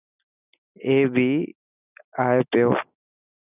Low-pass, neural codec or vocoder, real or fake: 3.6 kHz; none; real